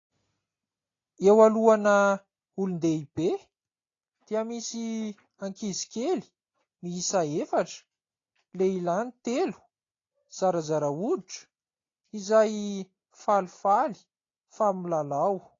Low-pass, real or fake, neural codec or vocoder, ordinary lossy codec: 7.2 kHz; real; none; AAC, 32 kbps